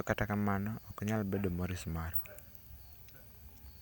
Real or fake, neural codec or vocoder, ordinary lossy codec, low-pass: real; none; none; none